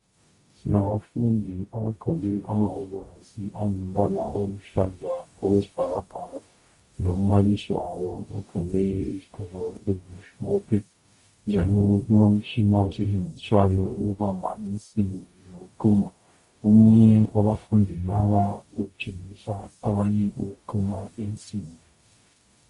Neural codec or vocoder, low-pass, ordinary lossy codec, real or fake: codec, 44.1 kHz, 0.9 kbps, DAC; 14.4 kHz; MP3, 48 kbps; fake